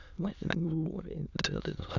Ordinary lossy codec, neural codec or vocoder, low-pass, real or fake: none; autoencoder, 22.05 kHz, a latent of 192 numbers a frame, VITS, trained on many speakers; 7.2 kHz; fake